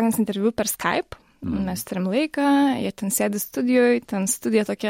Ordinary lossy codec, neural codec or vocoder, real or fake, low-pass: MP3, 64 kbps; none; real; 19.8 kHz